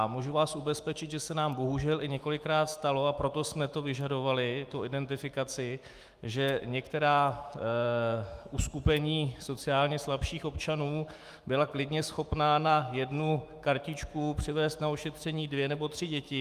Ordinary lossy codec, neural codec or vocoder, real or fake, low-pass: Opus, 32 kbps; autoencoder, 48 kHz, 128 numbers a frame, DAC-VAE, trained on Japanese speech; fake; 14.4 kHz